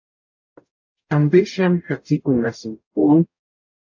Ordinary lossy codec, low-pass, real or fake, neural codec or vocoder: AAC, 48 kbps; 7.2 kHz; fake; codec, 44.1 kHz, 0.9 kbps, DAC